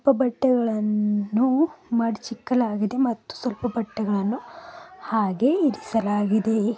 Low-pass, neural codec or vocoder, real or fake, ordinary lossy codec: none; none; real; none